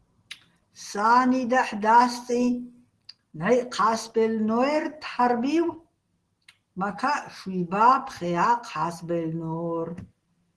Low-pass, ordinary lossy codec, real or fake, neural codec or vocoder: 10.8 kHz; Opus, 16 kbps; real; none